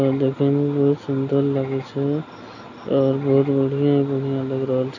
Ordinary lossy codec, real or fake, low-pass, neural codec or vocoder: none; real; 7.2 kHz; none